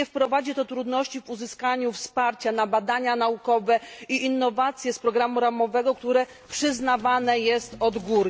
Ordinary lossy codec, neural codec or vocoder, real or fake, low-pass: none; none; real; none